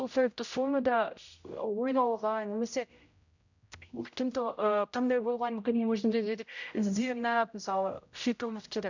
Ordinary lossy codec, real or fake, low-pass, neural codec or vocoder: none; fake; 7.2 kHz; codec, 16 kHz, 0.5 kbps, X-Codec, HuBERT features, trained on general audio